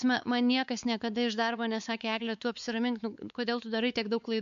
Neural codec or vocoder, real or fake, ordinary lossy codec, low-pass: codec, 16 kHz, 4 kbps, X-Codec, WavLM features, trained on Multilingual LibriSpeech; fake; AAC, 96 kbps; 7.2 kHz